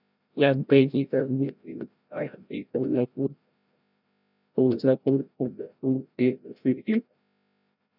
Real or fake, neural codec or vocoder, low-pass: fake; codec, 16 kHz, 0.5 kbps, FreqCodec, larger model; 5.4 kHz